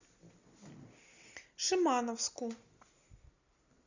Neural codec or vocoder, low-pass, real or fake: none; 7.2 kHz; real